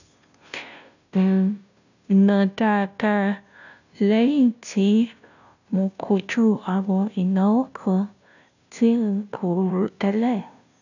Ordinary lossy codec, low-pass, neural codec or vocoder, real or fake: none; 7.2 kHz; codec, 16 kHz, 0.5 kbps, FunCodec, trained on Chinese and English, 25 frames a second; fake